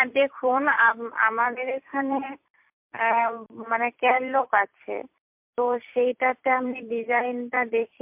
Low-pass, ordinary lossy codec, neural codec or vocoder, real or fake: 3.6 kHz; MP3, 32 kbps; vocoder, 44.1 kHz, 128 mel bands every 256 samples, BigVGAN v2; fake